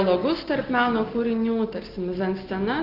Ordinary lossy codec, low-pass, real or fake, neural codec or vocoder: Opus, 32 kbps; 5.4 kHz; real; none